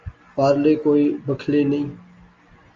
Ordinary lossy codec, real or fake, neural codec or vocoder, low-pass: Opus, 32 kbps; real; none; 7.2 kHz